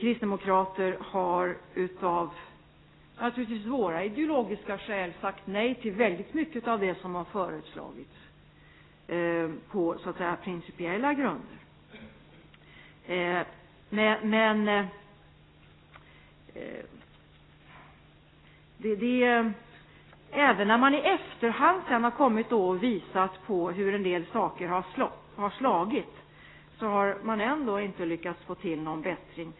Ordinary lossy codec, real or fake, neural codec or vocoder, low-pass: AAC, 16 kbps; real; none; 7.2 kHz